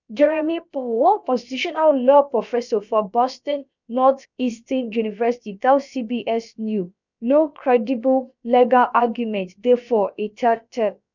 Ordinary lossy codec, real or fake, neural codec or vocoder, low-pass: none; fake; codec, 16 kHz, about 1 kbps, DyCAST, with the encoder's durations; 7.2 kHz